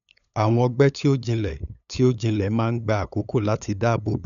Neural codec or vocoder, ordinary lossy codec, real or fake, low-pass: codec, 16 kHz, 8 kbps, FunCodec, trained on LibriTTS, 25 frames a second; none; fake; 7.2 kHz